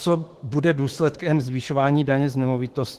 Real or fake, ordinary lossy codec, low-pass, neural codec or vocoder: fake; Opus, 16 kbps; 14.4 kHz; autoencoder, 48 kHz, 32 numbers a frame, DAC-VAE, trained on Japanese speech